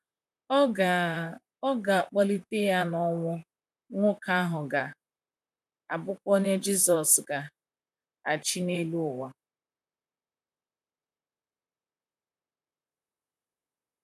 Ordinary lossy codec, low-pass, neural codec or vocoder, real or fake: none; 14.4 kHz; vocoder, 44.1 kHz, 128 mel bands, Pupu-Vocoder; fake